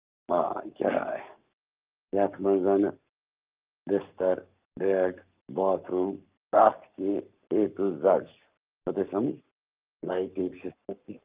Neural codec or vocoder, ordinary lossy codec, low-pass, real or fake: codec, 44.1 kHz, 7.8 kbps, Pupu-Codec; Opus, 24 kbps; 3.6 kHz; fake